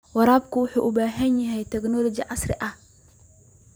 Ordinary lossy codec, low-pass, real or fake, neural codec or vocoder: none; none; fake; vocoder, 44.1 kHz, 128 mel bands every 256 samples, BigVGAN v2